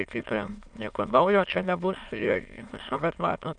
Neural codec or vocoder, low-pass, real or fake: autoencoder, 22.05 kHz, a latent of 192 numbers a frame, VITS, trained on many speakers; 9.9 kHz; fake